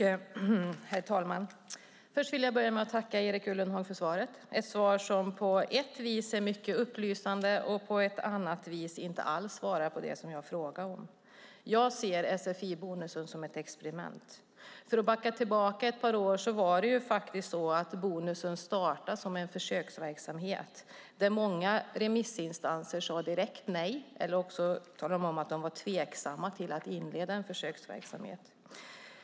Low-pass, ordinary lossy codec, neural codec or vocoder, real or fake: none; none; none; real